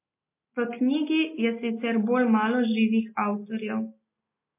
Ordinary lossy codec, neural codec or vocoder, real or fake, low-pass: MP3, 24 kbps; none; real; 3.6 kHz